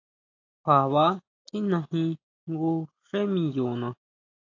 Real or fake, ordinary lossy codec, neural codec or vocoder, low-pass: real; AAC, 48 kbps; none; 7.2 kHz